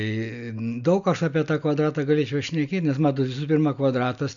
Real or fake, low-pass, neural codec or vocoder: real; 7.2 kHz; none